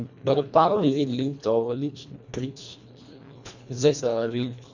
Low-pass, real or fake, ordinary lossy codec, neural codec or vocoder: 7.2 kHz; fake; none; codec, 24 kHz, 1.5 kbps, HILCodec